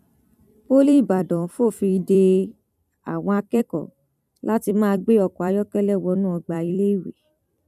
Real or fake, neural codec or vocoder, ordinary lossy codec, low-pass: fake; vocoder, 44.1 kHz, 128 mel bands every 256 samples, BigVGAN v2; none; 14.4 kHz